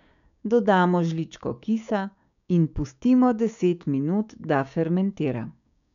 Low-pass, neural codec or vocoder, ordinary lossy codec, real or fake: 7.2 kHz; codec, 16 kHz, 6 kbps, DAC; none; fake